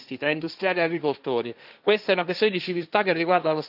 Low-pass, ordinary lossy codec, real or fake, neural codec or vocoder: 5.4 kHz; none; fake; codec, 16 kHz, 1.1 kbps, Voila-Tokenizer